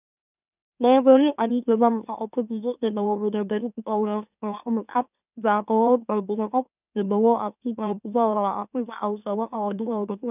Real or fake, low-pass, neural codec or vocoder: fake; 3.6 kHz; autoencoder, 44.1 kHz, a latent of 192 numbers a frame, MeloTTS